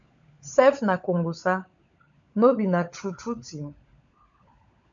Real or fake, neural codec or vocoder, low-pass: fake; codec, 16 kHz, 16 kbps, FunCodec, trained on LibriTTS, 50 frames a second; 7.2 kHz